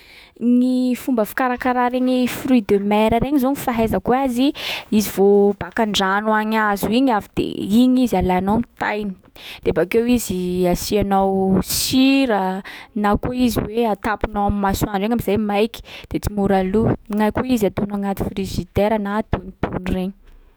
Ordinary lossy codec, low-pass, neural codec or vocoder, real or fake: none; none; autoencoder, 48 kHz, 128 numbers a frame, DAC-VAE, trained on Japanese speech; fake